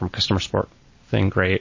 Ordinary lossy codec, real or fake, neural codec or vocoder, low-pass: MP3, 32 kbps; real; none; 7.2 kHz